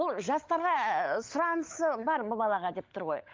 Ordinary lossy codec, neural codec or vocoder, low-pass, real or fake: Opus, 32 kbps; codec, 16 kHz, 16 kbps, FunCodec, trained on LibriTTS, 50 frames a second; 7.2 kHz; fake